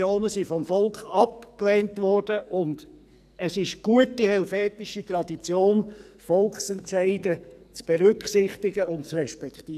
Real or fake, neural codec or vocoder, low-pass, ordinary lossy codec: fake; codec, 44.1 kHz, 2.6 kbps, SNAC; 14.4 kHz; none